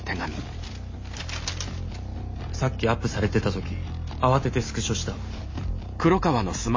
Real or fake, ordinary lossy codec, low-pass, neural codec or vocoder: real; MP3, 32 kbps; 7.2 kHz; none